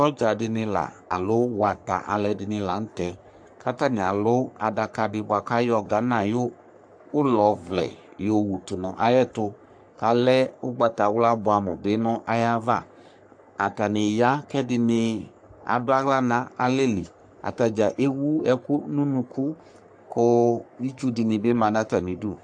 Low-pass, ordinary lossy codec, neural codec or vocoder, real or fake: 9.9 kHz; AAC, 64 kbps; codec, 44.1 kHz, 3.4 kbps, Pupu-Codec; fake